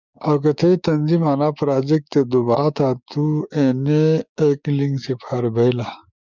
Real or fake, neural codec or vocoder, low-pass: fake; codec, 16 kHz, 6 kbps, DAC; 7.2 kHz